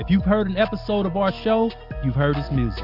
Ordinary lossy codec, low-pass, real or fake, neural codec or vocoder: AAC, 48 kbps; 5.4 kHz; real; none